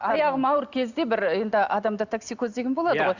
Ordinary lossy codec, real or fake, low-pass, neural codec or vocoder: Opus, 64 kbps; real; 7.2 kHz; none